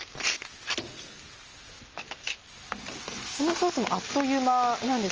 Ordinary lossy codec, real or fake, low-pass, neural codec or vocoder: Opus, 24 kbps; real; 7.2 kHz; none